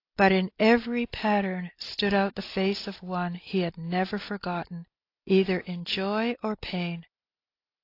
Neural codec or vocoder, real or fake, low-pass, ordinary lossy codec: none; real; 5.4 kHz; AAC, 32 kbps